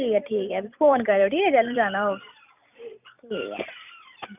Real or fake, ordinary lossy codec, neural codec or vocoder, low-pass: fake; none; vocoder, 44.1 kHz, 128 mel bands every 256 samples, BigVGAN v2; 3.6 kHz